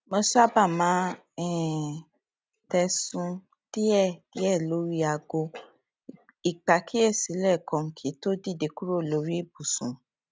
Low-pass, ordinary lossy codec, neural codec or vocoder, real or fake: none; none; none; real